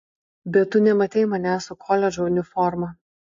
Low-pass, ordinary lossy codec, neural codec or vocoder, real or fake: 7.2 kHz; AAC, 48 kbps; none; real